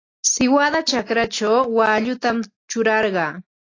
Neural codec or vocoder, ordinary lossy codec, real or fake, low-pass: none; AAC, 32 kbps; real; 7.2 kHz